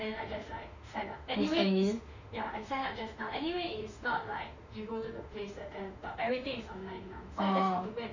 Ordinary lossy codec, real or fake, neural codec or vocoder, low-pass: none; fake; autoencoder, 48 kHz, 32 numbers a frame, DAC-VAE, trained on Japanese speech; 7.2 kHz